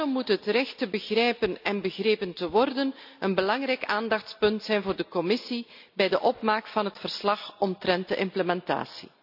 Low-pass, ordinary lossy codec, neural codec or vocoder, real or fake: 5.4 kHz; AAC, 48 kbps; none; real